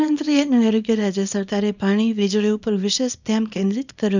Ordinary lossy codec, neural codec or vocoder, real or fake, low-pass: none; codec, 24 kHz, 0.9 kbps, WavTokenizer, small release; fake; 7.2 kHz